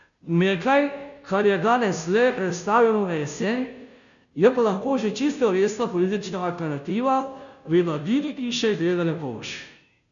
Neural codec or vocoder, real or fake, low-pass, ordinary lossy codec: codec, 16 kHz, 0.5 kbps, FunCodec, trained on Chinese and English, 25 frames a second; fake; 7.2 kHz; none